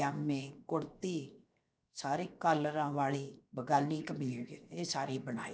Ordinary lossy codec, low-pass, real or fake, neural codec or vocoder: none; none; fake; codec, 16 kHz, 0.7 kbps, FocalCodec